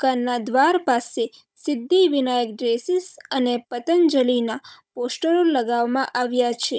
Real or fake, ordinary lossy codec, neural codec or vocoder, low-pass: fake; none; codec, 16 kHz, 16 kbps, FunCodec, trained on Chinese and English, 50 frames a second; none